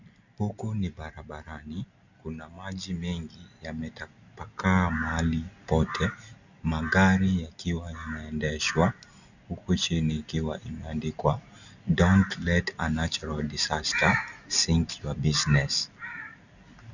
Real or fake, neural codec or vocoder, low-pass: real; none; 7.2 kHz